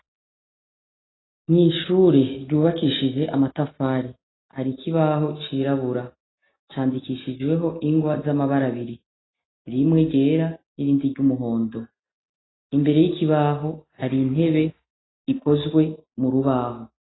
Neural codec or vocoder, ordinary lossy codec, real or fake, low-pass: none; AAC, 16 kbps; real; 7.2 kHz